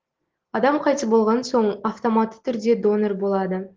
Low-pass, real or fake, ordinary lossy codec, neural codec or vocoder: 7.2 kHz; real; Opus, 16 kbps; none